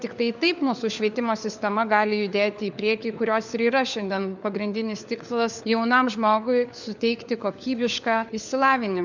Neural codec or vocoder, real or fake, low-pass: codec, 16 kHz, 4 kbps, FunCodec, trained on LibriTTS, 50 frames a second; fake; 7.2 kHz